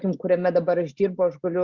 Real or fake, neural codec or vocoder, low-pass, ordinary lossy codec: real; none; 7.2 kHz; Opus, 64 kbps